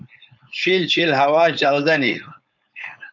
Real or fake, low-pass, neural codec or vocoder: fake; 7.2 kHz; codec, 16 kHz, 4.8 kbps, FACodec